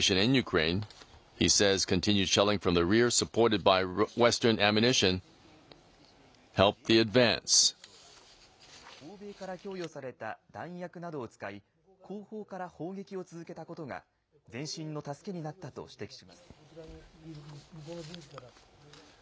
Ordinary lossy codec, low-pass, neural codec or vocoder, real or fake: none; none; none; real